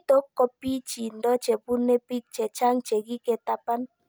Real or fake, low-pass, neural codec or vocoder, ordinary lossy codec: real; none; none; none